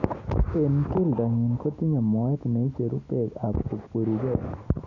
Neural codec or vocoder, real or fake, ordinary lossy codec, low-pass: none; real; none; 7.2 kHz